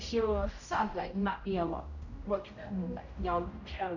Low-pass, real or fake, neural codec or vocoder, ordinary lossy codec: 7.2 kHz; fake; codec, 16 kHz, 0.5 kbps, X-Codec, HuBERT features, trained on general audio; none